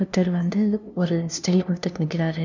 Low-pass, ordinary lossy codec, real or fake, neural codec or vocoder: 7.2 kHz; none; fake; codec, 16 kHz, 0.5 kbps, FunCodec, trained on LibriTTS, 25 frames a second